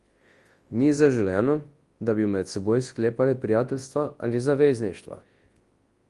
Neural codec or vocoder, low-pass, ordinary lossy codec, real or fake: codec, 24 kHz, 0.9 kbps, WavTokenizer, large speech release; 10.8 kHz; Opus, 24 kbps; fake